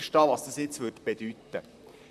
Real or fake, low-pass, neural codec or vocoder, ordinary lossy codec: fake; 14.4 kHz; vocoder, 44.1 kHz, 128 mel bands, Pupu-Vocoder; none